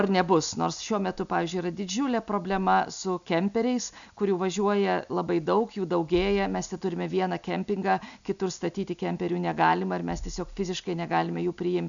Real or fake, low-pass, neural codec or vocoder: real; 7.2 kHz; none